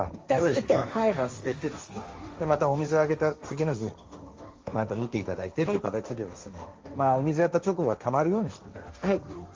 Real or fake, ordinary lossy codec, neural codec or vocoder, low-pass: fake; Opus, 32 kbps; codec, 16 kHz, 1.1 kbps, Voila-Tokenizer; 7.2 kHz